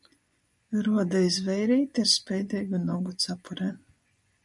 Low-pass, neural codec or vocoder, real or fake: 10.8 kHz; vocoder, 24 kHz, 100 mel bands, Vocos; fake